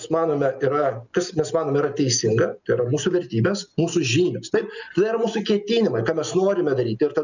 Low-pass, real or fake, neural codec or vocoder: 7.2 kHz; real; none